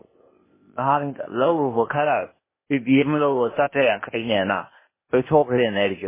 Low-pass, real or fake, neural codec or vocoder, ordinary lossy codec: 3.6 kHz; fake; codec, 16 kHz, 0.8 kbps, ZipCodec; MP3, 16 kbps